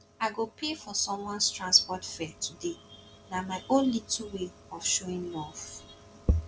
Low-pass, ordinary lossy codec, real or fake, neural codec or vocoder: none; none; real; none